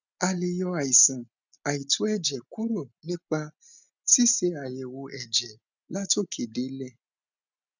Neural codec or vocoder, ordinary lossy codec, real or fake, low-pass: none; none; real; 7.2 kHz